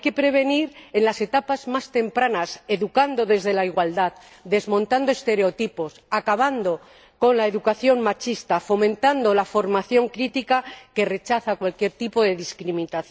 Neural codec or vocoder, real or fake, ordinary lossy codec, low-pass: none; real; none; none